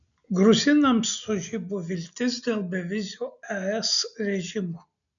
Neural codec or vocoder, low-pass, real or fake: none; 7.2 kHz; real